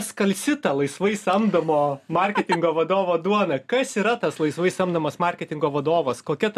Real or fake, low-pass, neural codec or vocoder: real; 14.4 kHz; none